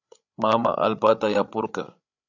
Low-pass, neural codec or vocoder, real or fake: 7.2 kHz; codec, 16 kHz, 8 kbps, FreqCodec, larger model; fake